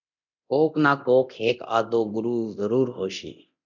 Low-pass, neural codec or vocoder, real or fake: 7.2 kHz; codec, 24 kHz, 0.9 kbps, DualCodec; fake